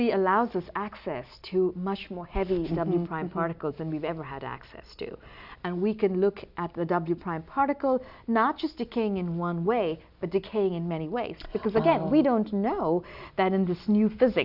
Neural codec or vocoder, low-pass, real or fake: none; 5.4 kHz; real